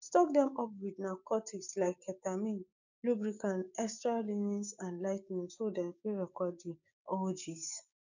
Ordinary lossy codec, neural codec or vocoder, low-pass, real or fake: none; codec, 16 kHz, 6 kbps, DAC; 7.2 kHz; fake